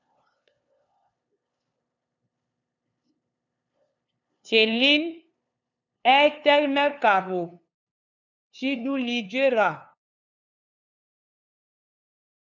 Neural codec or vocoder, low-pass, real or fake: codec, 16 kHz, 2 kbps, FunCodec, trained on LibriTTS, 25 frames a second; 7.2 kHz; fake